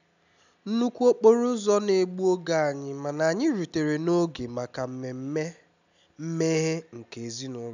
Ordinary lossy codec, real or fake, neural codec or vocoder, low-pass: none; real; none; 7.2 kHz